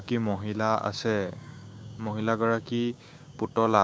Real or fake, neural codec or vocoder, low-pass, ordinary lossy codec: fake; codec, 16 kHz, 6 kbps, DAC; none; none